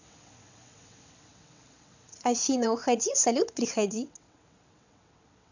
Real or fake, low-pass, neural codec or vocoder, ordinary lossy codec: fake; 7.2 kHz; vocoder, 22.05 kHz, 80 mel bands, WaveNeXt; none